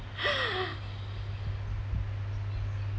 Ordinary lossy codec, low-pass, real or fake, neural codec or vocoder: none; none; real; none